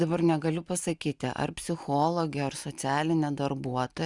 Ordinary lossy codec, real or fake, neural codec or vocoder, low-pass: Opus, 64 kbps; real; none; 10.8 kHz